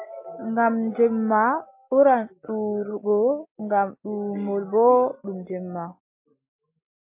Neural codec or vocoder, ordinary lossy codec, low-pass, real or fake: none; MP3, 24 kbps; 3.6 kHz; real